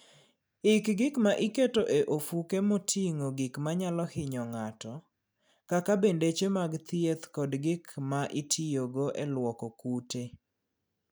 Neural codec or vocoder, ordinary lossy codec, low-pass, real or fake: none; none; none; real